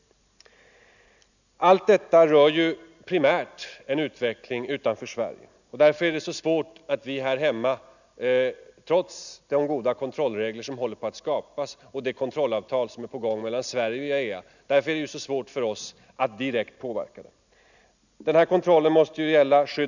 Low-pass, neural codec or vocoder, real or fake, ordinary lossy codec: 7.2 kHz; none; real; none